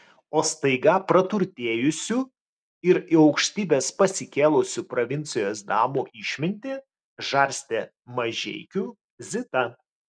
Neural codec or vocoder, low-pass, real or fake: none; 9.9 kHz; real